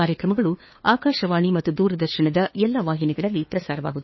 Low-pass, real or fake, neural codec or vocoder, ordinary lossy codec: 7.2 kHz; fake; codec, 16 kHz, 2 kbps, FunCodec, trained on LibriTTS, 25 frames a second; MP3, 24 kbps